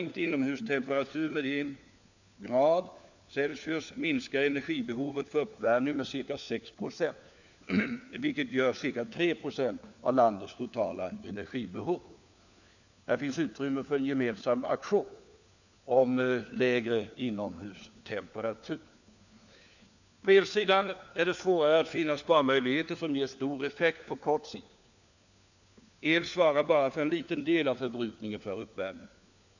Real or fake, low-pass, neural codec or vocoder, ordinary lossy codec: fake; 7.2 kHz; codec, 16 kHz, 4 kbps, FunCodec, trained on LibriTTS, 50 frames a second; none